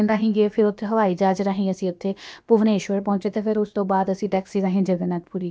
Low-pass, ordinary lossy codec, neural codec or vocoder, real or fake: none; none; codec, 16 kHz, about 1 kbps, DyCAST, with the encoder's durations; fake